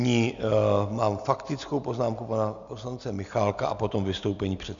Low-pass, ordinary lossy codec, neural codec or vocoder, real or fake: 7.2 kHz; Opus, 64 kbps; none; real